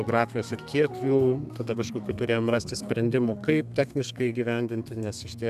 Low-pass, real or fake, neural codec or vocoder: 14.4 kHz; fake; codec, 32 kHz, 1.9 kbps, SNAC